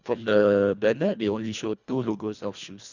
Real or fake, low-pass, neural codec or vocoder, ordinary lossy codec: fake; 7.2 kHz; codec, 24 kHz, 1.5 kbps, HILCodec; none